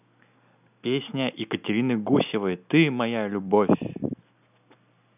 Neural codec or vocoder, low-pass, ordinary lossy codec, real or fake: none; 3.6 kHz; none; real